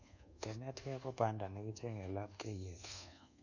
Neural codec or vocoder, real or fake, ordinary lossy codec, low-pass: codec, 24 kHz, 1.2 kbps, DualCodec; fake; none; 7.2 kHz